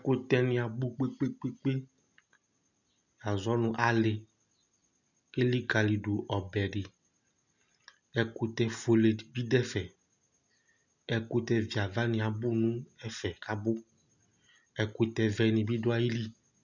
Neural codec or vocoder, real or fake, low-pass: none; real; 7.2 kHz